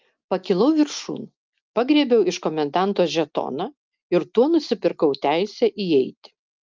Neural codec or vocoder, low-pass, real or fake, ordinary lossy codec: none; 7.2 kHz; real; Opus, 24 kbps